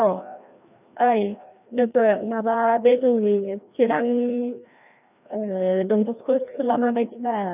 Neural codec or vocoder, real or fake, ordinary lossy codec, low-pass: codec, 16 kHz, 1 kbps, FreqCodec, larger model; fake; none; 3.6 kHz